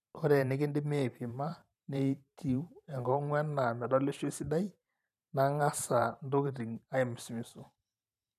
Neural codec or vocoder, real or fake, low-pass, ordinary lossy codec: vocoder, 44.1 kHz, 128 mel bands every 512 samples, BigVGAN v2; fake; 14.4 kHz; none